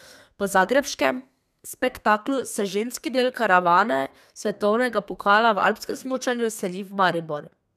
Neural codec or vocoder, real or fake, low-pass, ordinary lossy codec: codec, 32 kHz, 1.9 kbps, SNAC; fake; 14.4 kHz; none